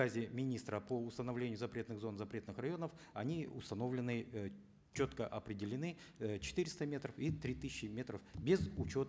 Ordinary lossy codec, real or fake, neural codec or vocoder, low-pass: none; real; none; none